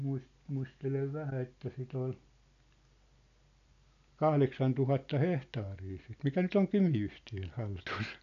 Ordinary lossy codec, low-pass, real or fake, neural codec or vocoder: none; 7.2 kHz; real; none